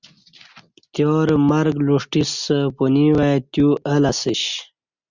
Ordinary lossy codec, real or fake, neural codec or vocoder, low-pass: Opus, 64 kbps; real; none; 7.2 kHz